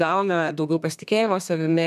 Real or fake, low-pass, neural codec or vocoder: fake; 14.4 kHz; codec, 32 kHz, 1.9 kbps, SNAC